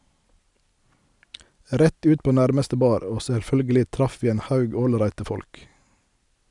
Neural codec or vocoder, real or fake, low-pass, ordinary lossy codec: none; real; 10.8 kHz; none